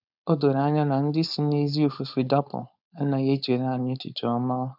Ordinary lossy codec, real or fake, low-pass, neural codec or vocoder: none; fake; 5.4 kHz; codec, 16 kHz, 4.8 kbps, FACodec